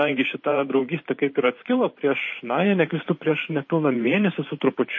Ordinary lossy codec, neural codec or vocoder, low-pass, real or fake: MP3, 32 kbps; vocoder, 44.1 kHz, 128 mel bands every 512 samples, BigVGAN v2; 7.2 kHz; fake